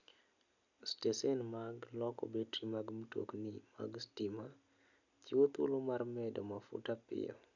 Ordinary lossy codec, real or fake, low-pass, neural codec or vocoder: none; real; 7.2 kHz; none